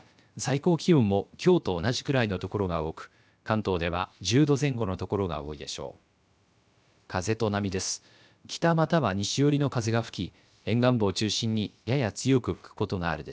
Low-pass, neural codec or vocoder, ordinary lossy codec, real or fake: none; codec, 16 kHz, about 1 kbps, DyCAST, with the encoder's durations; none; fake